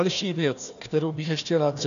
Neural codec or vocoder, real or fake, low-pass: codec, 16 kHz, 1 kbps, FunCodec, trained on Chinese and English, 50 frames a second; fake; 7.2 kHz